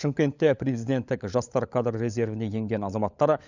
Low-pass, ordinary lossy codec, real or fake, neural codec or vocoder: 7.2 kHz; none; fake; codec, 16 kHz, 16 kbps, FunCodec, trained on LibriTTS, 50 frames a second